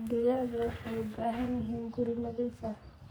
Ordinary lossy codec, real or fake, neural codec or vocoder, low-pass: none; fake; codec, 44.1 kHz, 3.4 kbps, Pupu-Codec; none